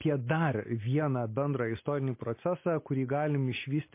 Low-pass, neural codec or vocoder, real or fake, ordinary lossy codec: 3.6 kHz; none; real; MP3, 24 kbps